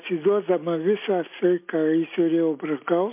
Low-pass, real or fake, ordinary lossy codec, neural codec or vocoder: 3.6 kHz; real; MP3, 24 kbps; none